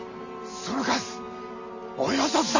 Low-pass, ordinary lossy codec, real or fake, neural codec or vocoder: 7.2 kHz; none; real; none